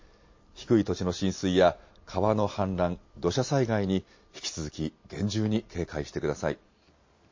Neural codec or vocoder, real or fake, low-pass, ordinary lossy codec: none; real; 7.2 kHz; MP3, 32 kbps